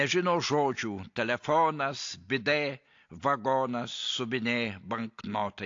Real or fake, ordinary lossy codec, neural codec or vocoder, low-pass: real; AAC, 48 kbps; none; 7.2 kHz